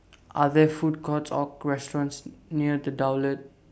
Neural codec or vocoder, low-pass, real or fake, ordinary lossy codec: none; none; real; none